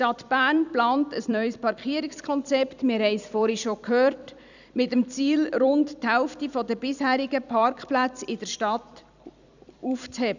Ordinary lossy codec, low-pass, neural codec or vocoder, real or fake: none; 7.2 kHz; vocoder, 44.1 kHz, 128 mel bands every 256 samples, BigVGAN v2; fake